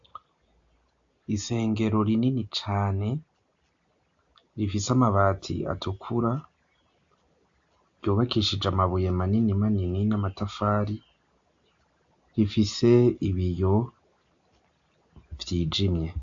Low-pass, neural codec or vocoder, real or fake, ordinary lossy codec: 7.2 kHz; none; real; AAC, 64 kbps